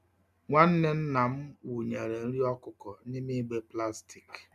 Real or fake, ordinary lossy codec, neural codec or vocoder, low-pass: real; none; none; 14.4 kHz